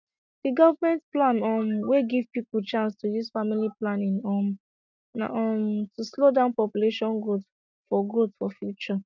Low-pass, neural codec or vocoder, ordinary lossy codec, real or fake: 7.2 kHz; none; none; real